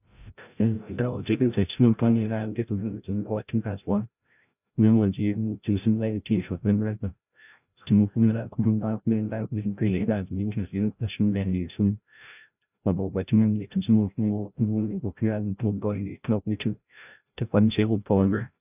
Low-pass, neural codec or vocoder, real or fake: 3.6 kHz; codec, 16 kHz, 0.5 kbps, FreqCodec, larger model; fake